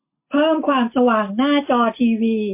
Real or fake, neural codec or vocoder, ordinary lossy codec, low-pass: real; none; MP3, 24 kbps; 3.6 kHz